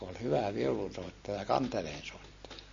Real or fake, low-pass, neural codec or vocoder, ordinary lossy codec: real; 7.2 kHz; none; MP3, 32 kbps